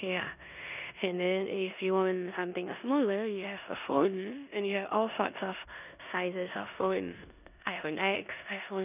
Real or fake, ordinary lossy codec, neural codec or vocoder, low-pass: fake; none; codec, 16 kHz in and 24 kHz out, 0.9 kbps, LongCat-Audio-Codec, four codebook decoder; 3.6 kHz